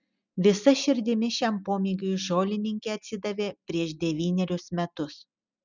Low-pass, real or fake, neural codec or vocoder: 7.2 kHz; real; none